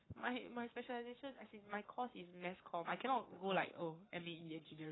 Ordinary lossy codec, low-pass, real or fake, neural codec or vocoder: AAC, 16 kbps; 7.2 kHz; fake; codec, 44.1 kHz, 7.8 kbps, Pupu-Codec